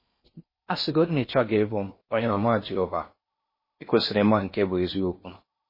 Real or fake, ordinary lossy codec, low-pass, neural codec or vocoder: fake; MP3, 24 kbps; 5.4 kHz; codec, 16 kHz in and 24 kHz out, 0.6 kbps, FocalCodec, streaming, 4096 codes